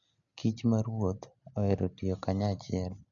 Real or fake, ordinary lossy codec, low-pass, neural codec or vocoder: real; none; 7.2 kHz; none